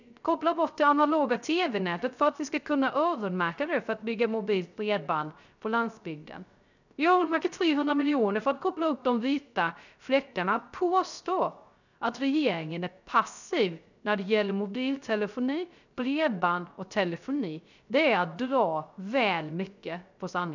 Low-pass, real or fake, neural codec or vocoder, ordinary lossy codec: 7.2 kHz; fake; codec, 16 kHz, 0.3 kbps, FocalCodec; none